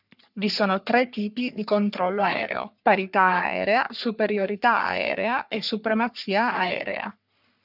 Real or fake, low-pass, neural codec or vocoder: fake; 5.4 kHz; codec, 44.1 kHz, 3.4 kbps, Pupu-Codec